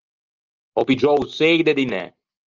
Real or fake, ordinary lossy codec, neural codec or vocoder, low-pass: real; Opus, 24 kbps; none; 7.2 kHz